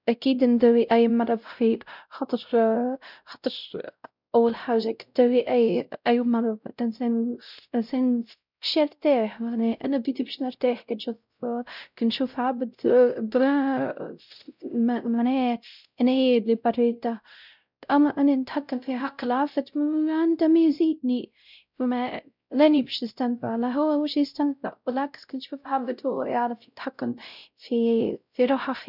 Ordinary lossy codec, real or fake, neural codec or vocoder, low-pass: none; fake; codec, 16 kHz, 0.5 kbps, X-Codec, WavLM features, trained on Multilingual LibriSpeech; 5.4 kHz